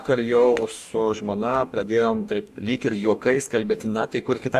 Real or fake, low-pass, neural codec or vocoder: fake; 14.4 kHz; codec, 44.1 kHz, 2.6 kbps, DAC